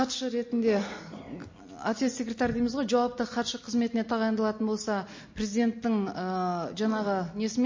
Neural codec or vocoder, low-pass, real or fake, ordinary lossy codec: none; 7.2 kHz; real; MP3, 32 kbps